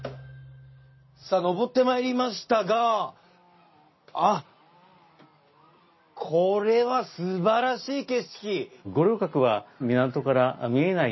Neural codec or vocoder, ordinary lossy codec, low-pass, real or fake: none; MP3, 24 kbps; 7.2 kHz; real